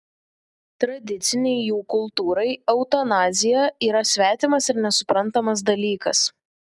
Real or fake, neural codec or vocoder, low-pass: real; none; 10.8 kHz